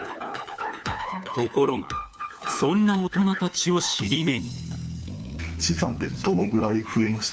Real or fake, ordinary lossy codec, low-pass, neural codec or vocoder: fake; none; none; codec, 16 kHz, 4 kbps, FunCodec, trained on LibriTTS, 50 frames a second